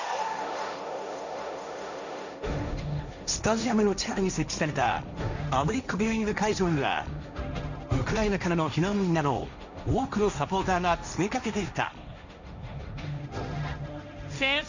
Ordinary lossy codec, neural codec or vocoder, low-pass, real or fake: none; codec, 16 kHz, 1.1 kbps, Voila-Tokenizer; 7.2 kHz; fake